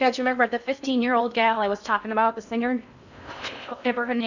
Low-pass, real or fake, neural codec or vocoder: 7.2 kHz; fake; codec, 16 kHz in and 24 kHz out, 0.6 kbps, FocalCodec, streaming, 4096 codes